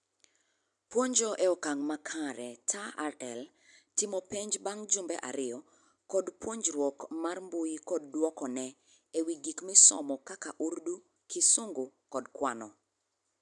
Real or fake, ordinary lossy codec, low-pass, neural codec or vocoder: real; none; 10.8 kHz; none